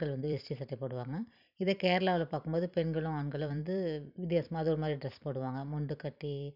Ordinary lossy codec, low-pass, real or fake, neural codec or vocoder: none; 5.4 kHz; fake; vocoder, 44.1 kHz, 128 mel bands every 256 samples, BigVGAN v2